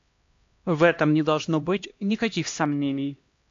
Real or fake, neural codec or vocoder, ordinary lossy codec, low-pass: fake; codec, 16 kHz, 1 kbps, X-Codec, HuBERT features, trained on LibriSpeech; AAC, 48 kbps; 7.2 kHz